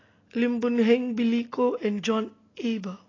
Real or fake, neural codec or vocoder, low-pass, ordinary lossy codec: real; none; 7.2 kHz; AAC, 32 kbps